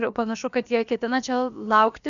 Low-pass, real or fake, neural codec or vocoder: 7.2 kHz; fake; codec, 16 kHz, about 1 kbps, DyCAST, with the encoder's durations